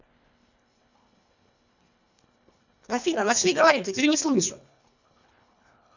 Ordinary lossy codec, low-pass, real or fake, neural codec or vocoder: none; 7.2 kHz; fake; codec, 24 kHz, 1.5 kbps, HILCodec